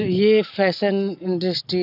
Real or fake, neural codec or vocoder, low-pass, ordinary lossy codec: real; none; 5.4 kHz; none